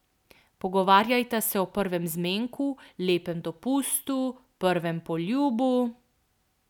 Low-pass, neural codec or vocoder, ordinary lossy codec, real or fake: 19.8 kHz; none; none; real